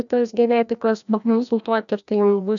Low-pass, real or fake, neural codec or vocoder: 7.2 kHz; fake; codec, 16 kHz, 1 kbps, FreqCodec, larger model